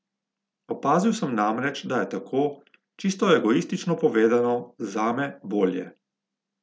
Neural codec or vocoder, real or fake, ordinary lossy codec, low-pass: none; real; none; none